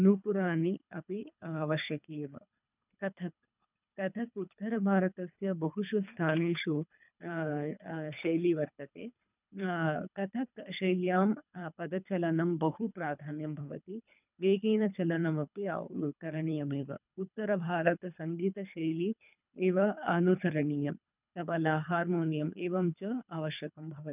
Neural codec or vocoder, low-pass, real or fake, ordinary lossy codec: codec, 24 kHz, 3 kbps, HILCodec; 3.6 kHz; fake; none